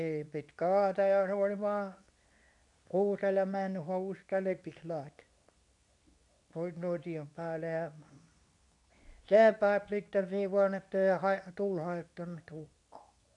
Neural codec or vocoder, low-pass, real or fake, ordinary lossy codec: codec, 24 kHz, 0.9 kbps, WavTokenizer, medium speech release version 2; 10.8 kHz; fake; none